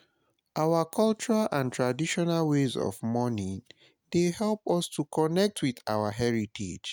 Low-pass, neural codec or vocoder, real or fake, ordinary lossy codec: none; none; real; none